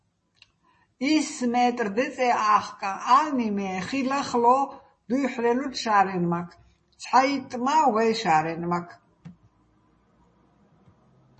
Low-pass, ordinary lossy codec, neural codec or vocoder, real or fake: 10.8 kHz; MP3, 32 kbps; none; real